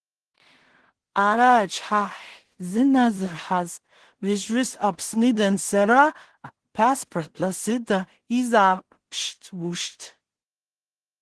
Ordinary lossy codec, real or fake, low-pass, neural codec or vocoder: Opus, 16 kbps; fake; 10.8 kHz; codec, 16 kHz in and 24 kHz out, 0.4 kbps, LongCat-Audio-Codec, two codebook decoder